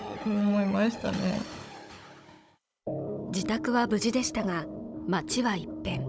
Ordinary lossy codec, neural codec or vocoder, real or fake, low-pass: none; codec, 16 kHz, 16 kbps, FunCodec, trained on Chinese and English, 50 frames a second; fake; none